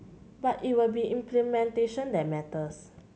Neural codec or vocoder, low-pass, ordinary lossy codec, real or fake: none; none; none; real